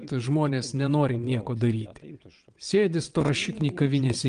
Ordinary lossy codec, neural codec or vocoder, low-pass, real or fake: Opus, 32 kbps; vocoder, 22.05 kHz, 80 mel bands, WaveNeXt; 9.9 kHz; fake